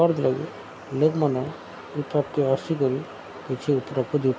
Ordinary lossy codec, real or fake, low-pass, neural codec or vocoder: none; real; none; none